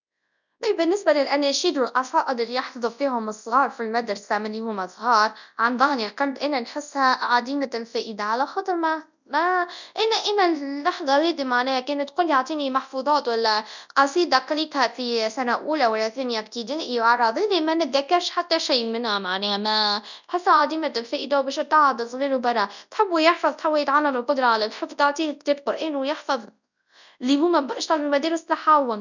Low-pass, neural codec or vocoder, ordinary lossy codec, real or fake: 7.2 kHz; codec, 24 kHz, 0.9 kbps, WavTokenizer, large speech release; none; fake